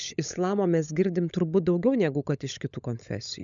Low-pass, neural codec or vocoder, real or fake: 7.2 kHz; codec, 16 kHz, 16 kbps, FunCodec, trained on LibriTTS, 50 frames a second; fake